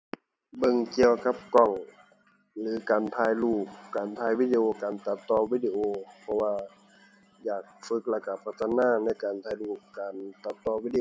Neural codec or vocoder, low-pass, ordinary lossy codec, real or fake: none; none; none; real